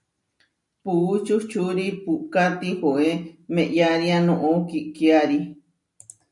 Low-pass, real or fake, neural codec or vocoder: 10.8 kHz; real; none